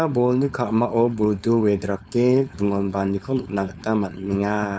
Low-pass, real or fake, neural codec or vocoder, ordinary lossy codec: none; fake; codec, 16 kHz, 4.8 kbps, FACodec; none